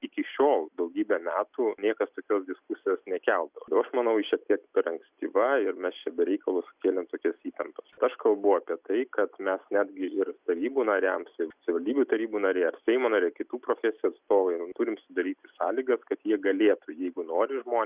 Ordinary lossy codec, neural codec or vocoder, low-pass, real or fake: Opus, 64 kbps; none; 3.6 kHz; real